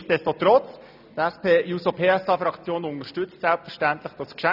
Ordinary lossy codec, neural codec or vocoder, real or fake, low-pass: none; none; real; 5.4 kHz